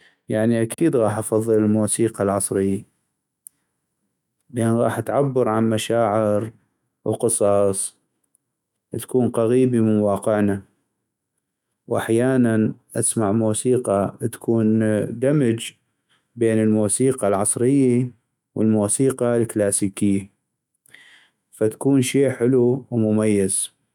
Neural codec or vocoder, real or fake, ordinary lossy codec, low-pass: autoencoder, 48 kHz, 128 numbers a frame, DAC-VAE, trained on Japanese speech; fake; none; 19.8 kHz